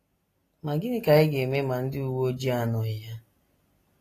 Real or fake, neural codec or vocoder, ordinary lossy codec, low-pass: real; none; AAC, 48 kbps; 14.4 kHz